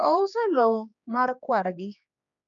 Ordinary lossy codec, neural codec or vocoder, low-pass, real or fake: none; codec, 16 kHz, 2 kbps, X-Codec, HuBERT features, trained on general audio; 7.2 kHz; fake